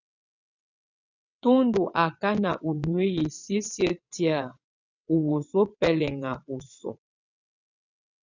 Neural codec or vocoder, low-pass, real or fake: vocoder, 22.05 kHz, 80 mel bands, WaveNeXt; 7.2 kHz; fake